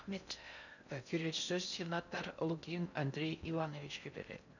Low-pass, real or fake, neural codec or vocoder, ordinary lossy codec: 7.2 kHz; fake; codec, 16 kHz in and 24 kHz out, 0.6 kbps, FocalCodec, streaming, 4096 codes; none